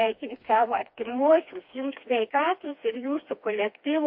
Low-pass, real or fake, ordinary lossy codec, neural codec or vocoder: 5.4 kHz; fake; MP3, 32 kbps; codec, 16 kHz, 2 kbps, FreqCodec, smaller model